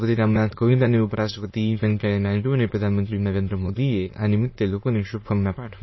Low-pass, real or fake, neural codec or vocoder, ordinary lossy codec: 7.2 kHz; fake; autoencoder, 22.05 kHz, a latent of 192 numbers a frame, VITS, trained on many speakers; MP3, 24 kbps